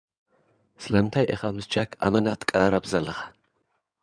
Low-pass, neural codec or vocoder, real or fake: 9.9 kHz; codec, 16 kHz in and 24 kHz out, 2.2 kbps, FireRedTTS-2 codec; fake